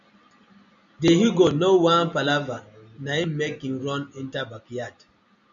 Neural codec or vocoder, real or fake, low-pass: none; real; 7.2 kHz